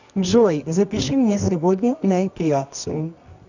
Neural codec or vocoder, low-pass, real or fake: codec, 24 kHz, 0.9 kbps, WavTokenizer, medium music audio release; 7.2 kHz; fake